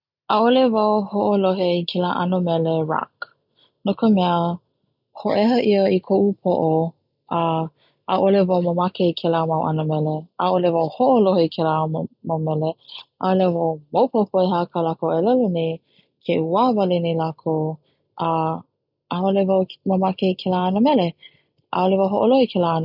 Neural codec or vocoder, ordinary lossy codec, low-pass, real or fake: none; none; 5.4 kHz; real